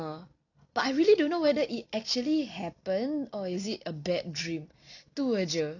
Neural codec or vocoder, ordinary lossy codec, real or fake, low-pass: none; none; real; 7.2 kHz